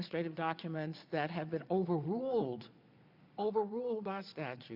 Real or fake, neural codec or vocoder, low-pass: fake; vocoder, 22.05 kHz, 80 mel bands, Vocos; 5.4 kHz